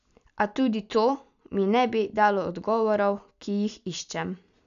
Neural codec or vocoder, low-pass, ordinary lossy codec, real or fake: none; 7.2 kHz; none; real